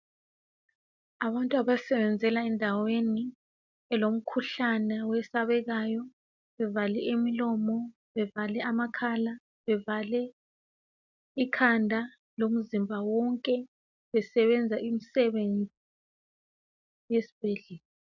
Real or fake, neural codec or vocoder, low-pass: real; none; 7.2 kHz